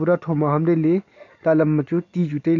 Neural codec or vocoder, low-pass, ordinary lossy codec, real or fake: none; 7.2 kHz; none; real